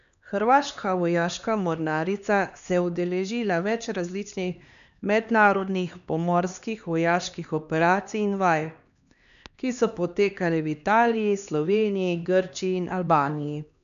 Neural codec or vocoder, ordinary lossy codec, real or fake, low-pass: codec, 16 kHz, 2 kbps, X-Codec, HuBERT features, trained on LibriSpeech; none; fake; 7.2 kHz